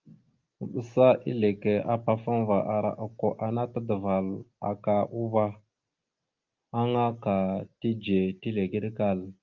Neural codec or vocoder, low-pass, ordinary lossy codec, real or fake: none; 7.2 kHz; Opus, 32 kbps; real